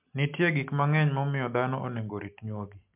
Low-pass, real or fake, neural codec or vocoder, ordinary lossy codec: 3.6 kHz; real; none; MP3, 32 kbps